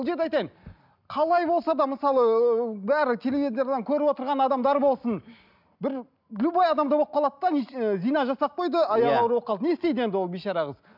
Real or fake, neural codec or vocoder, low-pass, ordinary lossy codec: real; none; 5.4 kHz; none